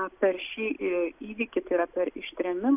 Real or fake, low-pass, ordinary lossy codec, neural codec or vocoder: real; 3.6 kHz; AAC, 32 kbps; none